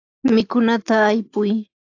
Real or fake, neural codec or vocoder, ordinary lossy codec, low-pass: real; none; AAC, 48 kbps; 7.2 kHz